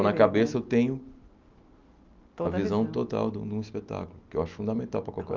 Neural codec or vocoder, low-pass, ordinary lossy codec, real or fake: none; 7.2 kHz; Opus, 24 kbps; real